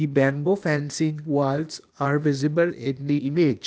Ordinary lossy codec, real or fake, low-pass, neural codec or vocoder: none; fake; none; codec, 16 kHz, 0.8 kbps, ZipCodec